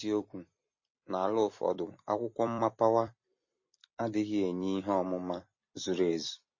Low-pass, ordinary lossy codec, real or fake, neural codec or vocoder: 7.2 kHz; MP3, 32 kbps; fake; vocoder, 44.1 kHz, 128 mel bands every 256 samples, BigVGAN v2